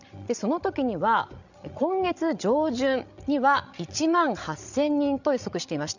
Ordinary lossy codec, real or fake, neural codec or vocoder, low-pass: none; fake; codec, 16 kHz, 16 kbps, FreqCodec, larger model; 7.2 kHz